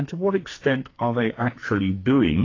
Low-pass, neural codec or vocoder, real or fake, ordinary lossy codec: 7.2 kHz; codec, 44.1 kHz, 2.6 kbps, SNAC; fake; AAC, 32 kbps